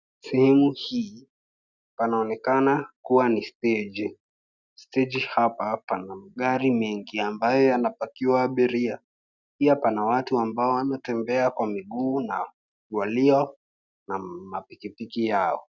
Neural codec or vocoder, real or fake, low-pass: none; real; 7.2 kHz